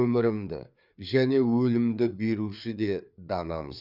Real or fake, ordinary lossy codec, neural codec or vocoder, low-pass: fake; none; codec, 16 kHz, 4 kbps, FreqCodec, larger model; 5.4 kHz